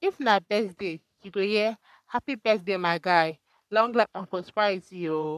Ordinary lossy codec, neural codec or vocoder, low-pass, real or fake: none; codec, 44.1 kHz, 3.4 kbps, Pupu-Codec; 14.4 kHz; fake